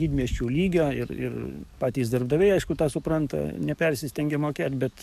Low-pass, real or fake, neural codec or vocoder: 14.4 kHz; fake; codec, 44.1 kHz, 7.8 kbps, DAC